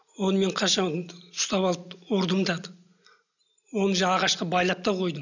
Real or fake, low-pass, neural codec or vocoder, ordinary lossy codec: real; 7.2 kHz; none; none